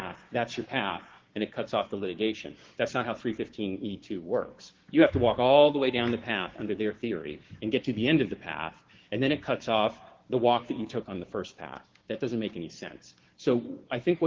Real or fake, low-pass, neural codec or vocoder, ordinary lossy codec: fake; 7.2 kHz; codec, 16 kHz, 6 kbps, DAC; Opus, 16 kbps